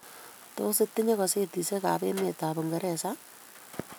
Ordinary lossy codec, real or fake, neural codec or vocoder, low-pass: none; real; none; none